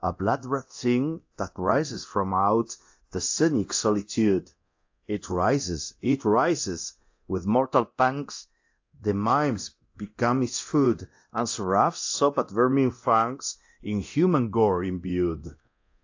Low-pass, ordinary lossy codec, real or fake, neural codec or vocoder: 7.2 kHz; AAC, 48 kbps; fake; codec, 24 kHz, 0.9 kbps, DualCodec